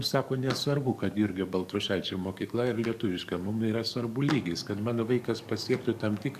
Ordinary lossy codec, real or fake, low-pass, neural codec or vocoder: AAC, 96 kbps; fake; 14.4 kHz; codec, 44.1 kHz, 7.8 kbps, DAC